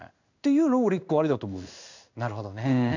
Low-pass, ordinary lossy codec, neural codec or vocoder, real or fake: 7.2 kHz; none; codec, 16 kHz in and 24 kHz out, 1 kbps, XY-Tokenizer; fake